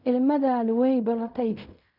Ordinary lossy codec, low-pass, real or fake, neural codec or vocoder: none; 5.4 kHz; fake; codec, 16 kHz in and 24 kHz out, 0.4 kbps, LongCat-Audio-Codec, fine tuned four codebook decoder